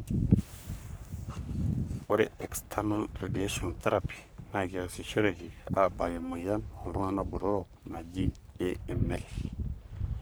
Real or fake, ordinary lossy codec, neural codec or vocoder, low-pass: fake; none; codec, 44.1 kHz, 3.4 kbps, Pupu-Codec; none